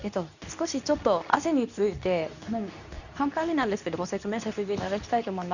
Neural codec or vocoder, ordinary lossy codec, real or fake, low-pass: codec, 24 kHz, 0.9 kbps, WavTokenizer, medium speech release version 2; none; fake; 7.2 kHz